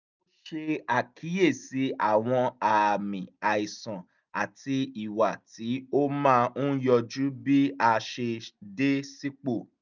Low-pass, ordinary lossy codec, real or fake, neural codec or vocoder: 7.2 kHz; none; real; none